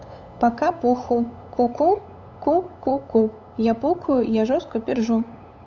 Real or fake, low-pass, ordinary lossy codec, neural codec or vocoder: fake; 7.2 kHz; none; codec, 16 kHz, 8 kbps, FunCodec, trained on LibriTTS, 25 frames a second